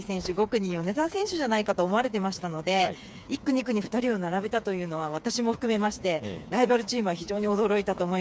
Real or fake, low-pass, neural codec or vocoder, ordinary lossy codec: fake; none; codec, 16 kHz, 4 kbps, FreqCodec, smaller model; none